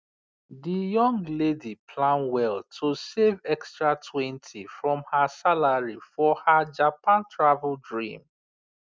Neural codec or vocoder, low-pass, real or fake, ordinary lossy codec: none; none; real; none